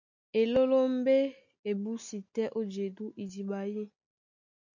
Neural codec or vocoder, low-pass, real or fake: none; 7.2 kHz; real